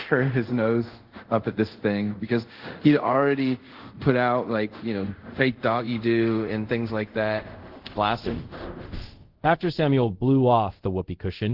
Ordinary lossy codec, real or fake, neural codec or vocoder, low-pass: Opus, 16 kbps; fake; codec, 24 kHz, 0.5 kbps, DualCodec; 5.4 kHz